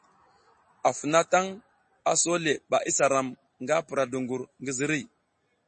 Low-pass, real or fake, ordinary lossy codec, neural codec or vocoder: 10.8 kHz; real; MP3, 32 kbps; none